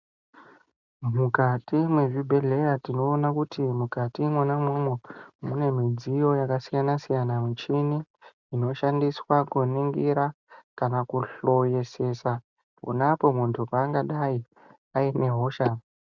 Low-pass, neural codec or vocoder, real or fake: 7.2 kHz; none; real